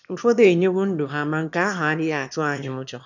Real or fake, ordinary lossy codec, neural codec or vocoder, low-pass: fake; none; autoencoder, 22.05 kHz, a latent of 192 numbers a frame, VITS, trained on one speaker; 7.2 kHz